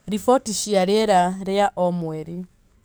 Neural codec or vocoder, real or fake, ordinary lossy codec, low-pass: codec, 44.1 kHz, 7.8 kbps, DAC; fake; none; none